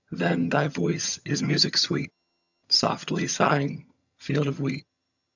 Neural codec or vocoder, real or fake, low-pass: vocoder, 22.05 kHz, 80 mel bands, HiFi-GAN; fake; 7.2 kHz